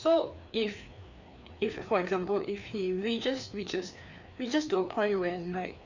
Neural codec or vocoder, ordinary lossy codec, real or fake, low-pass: codec, 16 kHz, 2 kbps, FreqCodec, larger model; none; fake; 7.2 kHz